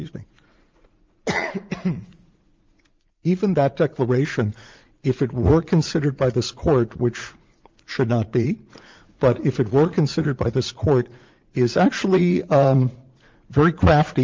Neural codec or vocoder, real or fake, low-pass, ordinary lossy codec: vocoder, 44.1 kHz, 80 mel bands, Vocos; fake; 7.2 kHz; Opus, 32 kbps